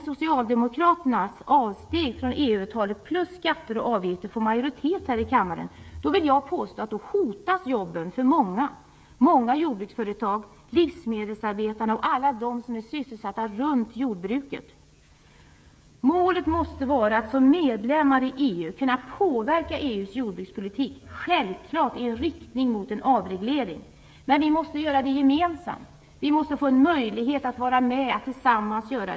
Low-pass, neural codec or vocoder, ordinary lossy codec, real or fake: none; codec, 16 kHz, 16 kbps, FreqCodec, smaller model; none; fake